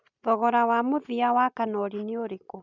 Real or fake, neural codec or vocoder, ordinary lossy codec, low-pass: real; none; none; 7.2 kHz